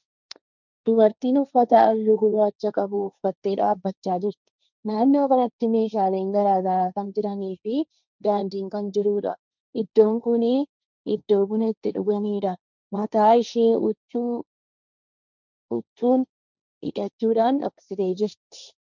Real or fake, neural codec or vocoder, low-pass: fake; codec, 16 kHz, 1.1 kbps, Voila-Tokenizer; 7.2 kHz